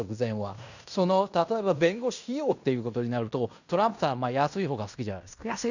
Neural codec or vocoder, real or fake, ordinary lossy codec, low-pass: codec, 16 kHz in and 24 kHz out, 0.9 kbps, LongCat-Audio-Codec, fine tuned four codebook decoder; fake; none; 7.2 kHz